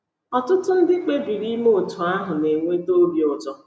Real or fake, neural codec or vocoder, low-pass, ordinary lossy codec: real; none; none; none